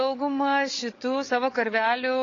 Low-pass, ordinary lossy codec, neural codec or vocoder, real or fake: 7.2 kHz; AAC, 32 kbps; codec, 16 kHz, 16 kbps, FreqCodec, larger model; fake